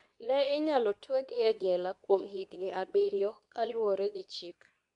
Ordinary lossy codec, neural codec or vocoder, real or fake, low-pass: none; codec, 24 kHz, 0.9 kbps, WavTokenizer, medium speech release version 2; fake; 10.8 kHz